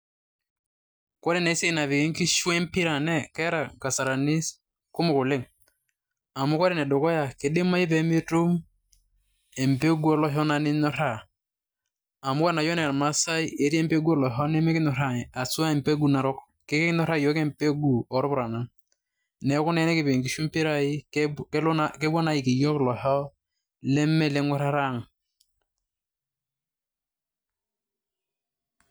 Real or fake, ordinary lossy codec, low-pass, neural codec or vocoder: real; none; none; none